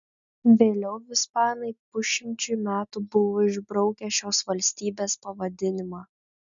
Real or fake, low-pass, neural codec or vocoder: real; 7.2 kHz; none